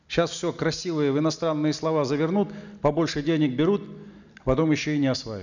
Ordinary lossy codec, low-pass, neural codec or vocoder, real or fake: none; 7.2 kHz; none; real